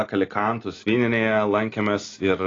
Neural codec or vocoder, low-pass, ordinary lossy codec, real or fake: none; 7.2 kHz; AAC, 32 kbps; real